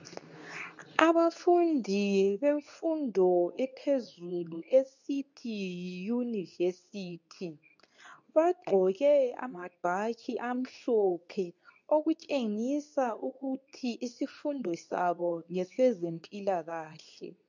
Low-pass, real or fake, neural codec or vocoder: 7.2 kHz; fake; codec, 24 kHz, 0.9 kbps, WavTokenizer, medium speech release version 2